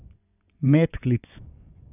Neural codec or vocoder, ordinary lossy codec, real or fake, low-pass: vocoder, 24 kHz, 100 mel bands, Vocos; none; fake; 3.6 kHz